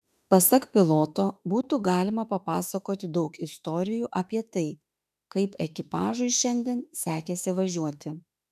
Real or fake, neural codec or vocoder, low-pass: fake; autoencoder, 48 kHz, 32 numbers a frame, DAC-VAE, trained on Japanese speech; 14.4 kHz